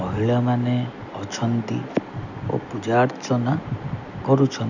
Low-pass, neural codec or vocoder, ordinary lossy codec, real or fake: 7.2 kHz; none; none; real